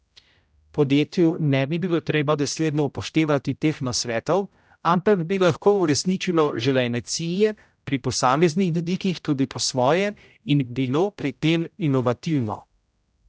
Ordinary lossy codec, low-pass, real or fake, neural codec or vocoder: none; none; fake; codec, 16 kHz, 0.5 kbps, X-Codec, HuBERT features, trained on general audio